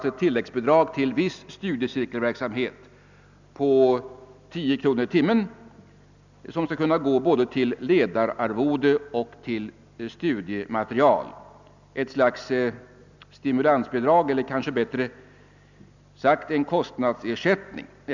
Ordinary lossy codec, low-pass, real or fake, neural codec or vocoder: none; 7.2 kHz; real; none